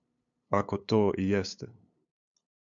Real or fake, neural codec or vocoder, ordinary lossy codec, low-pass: fake; codec, 16 kHz, 8 kbps, FunCodec, trained on LibriTTS, 25 frames a second; MP3, 64 kbps; 7.2 kHz